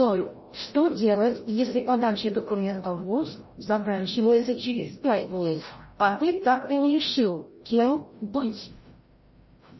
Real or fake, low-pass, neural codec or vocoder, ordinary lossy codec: fake; 7.2 kHz; codec, 16 kHz, 0.5 kbps, FreqCodec, larger model; MP3, 24 kbps